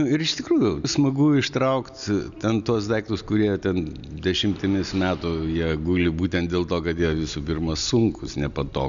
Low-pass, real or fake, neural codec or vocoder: 7.2 kHz; real; none